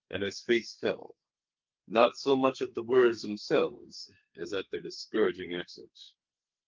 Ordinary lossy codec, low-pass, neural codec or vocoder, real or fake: Opus, 32 kbps; 7.2 kHz; codec, 32 kHz, 1.9 kbps, SNAC; fake